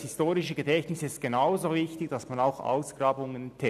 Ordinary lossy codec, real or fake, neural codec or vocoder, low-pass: none; real; none; 14.4 kHz